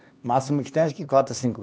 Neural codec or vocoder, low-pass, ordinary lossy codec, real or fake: codec, 16 kHz, 2 kbps, X-Codec, HuBERT features, trained on LibriSpeech; none; none; fake